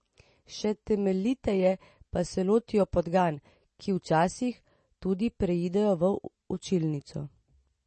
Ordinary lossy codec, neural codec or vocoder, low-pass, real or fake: MP3, 32 kbps; none; 10.8 kHz; real